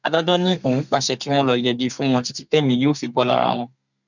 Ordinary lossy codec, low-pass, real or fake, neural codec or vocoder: none; 7.2 kHz; fake; codec, 32 kHz, 1.9 kbps, SNAC